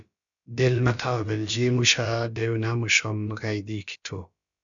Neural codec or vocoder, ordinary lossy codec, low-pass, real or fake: codec, 16 kHz, about 1 kbps, DyCAST, with the encoder's durations; MP3, 96 kbps; 7.2 kHz; fake